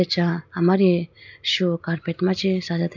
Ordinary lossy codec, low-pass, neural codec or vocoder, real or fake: none; 7.2 kHz; none; real